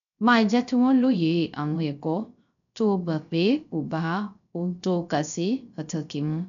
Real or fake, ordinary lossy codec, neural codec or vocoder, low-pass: fake; MP3, 96 kbps; codec, 16 kHz, 0.3 kbps, FocalCodec; 7.2 kHz